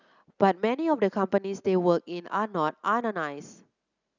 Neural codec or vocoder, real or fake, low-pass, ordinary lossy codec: none; real; 7.2 kHz; none